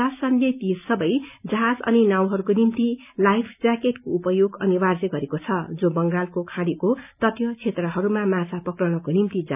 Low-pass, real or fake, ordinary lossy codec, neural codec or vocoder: 3.6 kHz; real; none; none